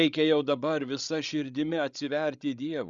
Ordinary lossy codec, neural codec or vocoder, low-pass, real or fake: Opus, 64 kbps; codec, 16 kHz, 16 kbps, FreqCodec, larger model; 7.2 kHz; fake